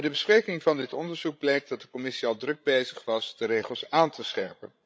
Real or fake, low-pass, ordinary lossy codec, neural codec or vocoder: fake; none; none; codec, 16 kHz, 16 kbps, FreqCodec, larger model